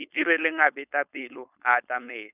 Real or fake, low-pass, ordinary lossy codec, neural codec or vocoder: fake; 3.6 kHz; none; codec, 16 kHz, 4.8 kbps, FACodec